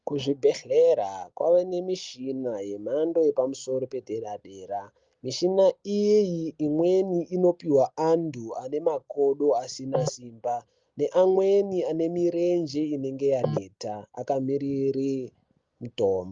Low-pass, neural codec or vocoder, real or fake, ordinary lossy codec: 7.2 kHz; none; real; Opus, 32 kbps